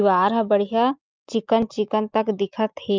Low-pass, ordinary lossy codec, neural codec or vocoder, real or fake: 7.2 kHz; Opus, 32 kbps; none; real